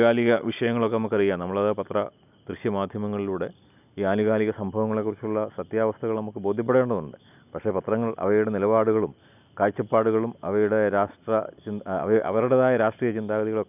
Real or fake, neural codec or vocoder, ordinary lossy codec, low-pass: real; none; none; 3.6 kHz